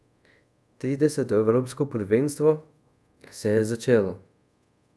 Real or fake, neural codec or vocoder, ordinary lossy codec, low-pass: fake; codec, 24 kHz, 0.5 kbps, DualCodec; none; none